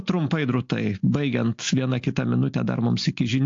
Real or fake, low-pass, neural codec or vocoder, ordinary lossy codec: real; 7.2 kHz; none; AAC, 48 kbps